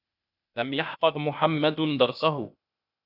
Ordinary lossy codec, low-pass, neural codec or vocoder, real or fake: AAC, 48 kbps; 5.4 kHz; codec, 16 kHz, 0.8 kbps, ZipCodec; fake